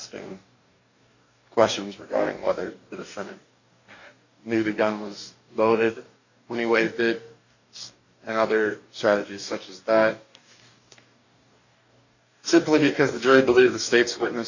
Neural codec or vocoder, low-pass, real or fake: codec, 44.1 kHz, 2.6 kbps, DAC; 7.2 kHz; fake